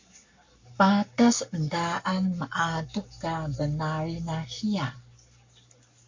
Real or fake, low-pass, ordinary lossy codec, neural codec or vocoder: fake; 7.2 kHz; MP3, 48 kbps; codec, 44.1 kHz, 7.8 kbps, Pupu-Codec